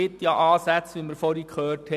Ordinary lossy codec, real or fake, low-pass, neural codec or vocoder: none; real; 14.4 kHz; none